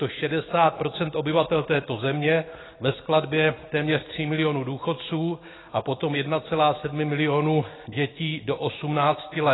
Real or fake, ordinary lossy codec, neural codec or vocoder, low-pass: real; AAC, 16 kbps; none; 7.2 kHz